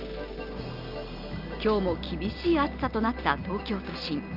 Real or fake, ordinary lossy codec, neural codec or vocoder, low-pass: real; Opus, 24 kbps; none; 5.4 kHz